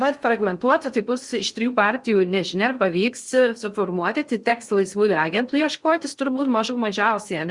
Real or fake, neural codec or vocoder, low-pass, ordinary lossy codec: fake; codec, 16 kHz in and 24 kHz out, 0.6 kbps, FocalCodec, streaming, 2048 codes; 10.8 kHz; Opus, 64 kbps